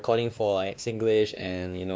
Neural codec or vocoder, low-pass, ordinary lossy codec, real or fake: codec, 16 kHz, 2 kbps, X-Codec, WavLM features, trained on Multilingual LibriSpeech; none; none; fake